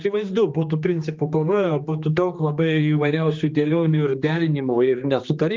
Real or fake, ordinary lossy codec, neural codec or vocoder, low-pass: fake; Opus, 24 kbps; codec, 16 kHz, 2 kbps, X-Codec, HuBERT features, trained on general audio; 7.2 kHz